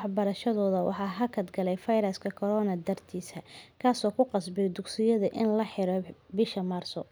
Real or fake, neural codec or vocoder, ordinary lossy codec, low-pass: real; none; none; none